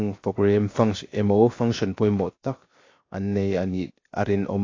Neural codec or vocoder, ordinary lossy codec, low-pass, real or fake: codec, 16 kHz, 0.7 kbps, FocalCodec; AAC, 32 kbps; 7.2 kHz; fake